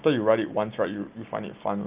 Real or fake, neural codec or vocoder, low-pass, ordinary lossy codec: real; none; 3.6 kHz; Opus, 32 kbps